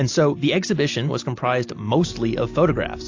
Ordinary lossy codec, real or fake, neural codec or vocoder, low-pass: AAC, 48 kbps; real; none; 7.2 kHz